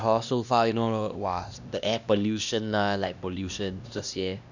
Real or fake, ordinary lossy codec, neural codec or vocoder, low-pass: fake; none; codec, 16 kHz, 1 kbps, X-Codec, HuBERT features, trained on LibriSpeech; 7.2 kHz